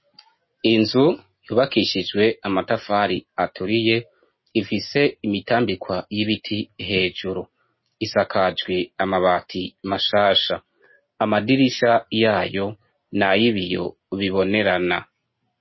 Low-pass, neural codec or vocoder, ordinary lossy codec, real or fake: 7.2 kHz; none; MP3, 24 kbps; real